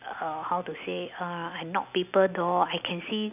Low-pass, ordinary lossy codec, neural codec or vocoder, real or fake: 3.6 kHz; none; none; real